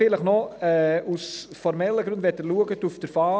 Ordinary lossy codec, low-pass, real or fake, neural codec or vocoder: none; none; real; none